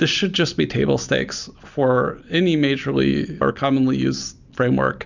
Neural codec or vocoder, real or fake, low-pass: none; real; 7.2 kHz